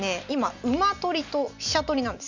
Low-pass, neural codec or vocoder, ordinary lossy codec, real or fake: 7.2 kHz; none; none; real